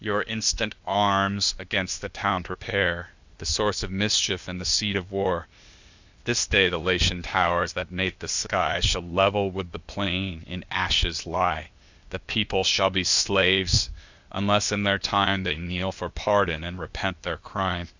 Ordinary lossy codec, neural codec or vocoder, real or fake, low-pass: Opus, 64 kbps; codec, 16 kHz, 0.8 kbps, ZipCodec; fake; 7.2 kHz